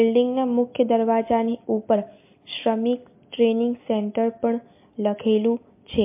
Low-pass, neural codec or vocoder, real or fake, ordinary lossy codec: 3.6 kHz; none; real; MP3, 24 kbps